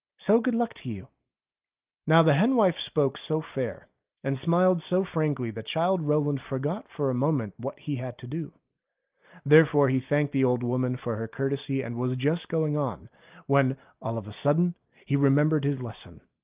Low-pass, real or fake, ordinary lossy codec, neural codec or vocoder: 3.6 kHz; real; Opus, 32 kbps; none